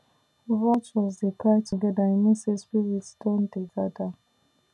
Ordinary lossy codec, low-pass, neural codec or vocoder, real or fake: none; none; none; real